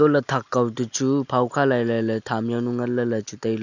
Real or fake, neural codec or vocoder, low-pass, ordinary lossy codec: real; none; 7.2 kHz; none